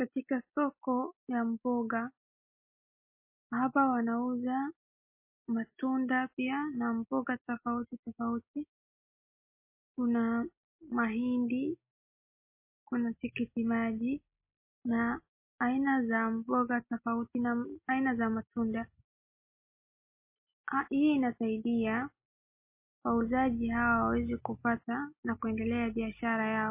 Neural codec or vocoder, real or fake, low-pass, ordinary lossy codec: none; real; 3.6 kHz; MP3, 24 kbps